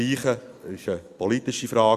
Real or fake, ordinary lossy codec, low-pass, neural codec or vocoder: real; Opus, 64 kbps; 14.4 kHz; none